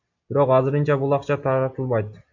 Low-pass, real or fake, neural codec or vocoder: 7.2 kHz; real; none